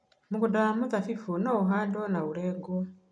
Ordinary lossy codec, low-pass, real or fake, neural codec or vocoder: none; none; real; none